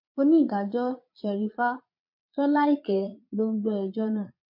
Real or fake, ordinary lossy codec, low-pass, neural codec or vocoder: fake; MP3, 32 kbps; 5.4 kHz; vocoder, 22.05 kHz, 80 mel bands, Vocos